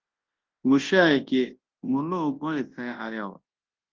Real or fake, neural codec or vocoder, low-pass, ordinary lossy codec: fake; codec, 24 kHz, 0.9 kbps, WavTokenizer, large speech release; 7.2 kHz; Opus, 16 kbps